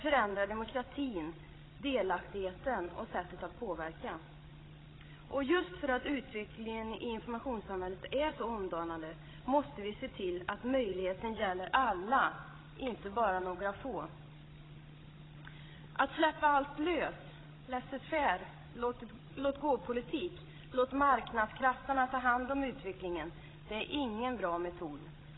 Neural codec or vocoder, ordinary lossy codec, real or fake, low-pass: codec, 16 kHz, 16 kbps, FreqCodec, larger model; AAC, 16 kbps; fake; 7.2 kHz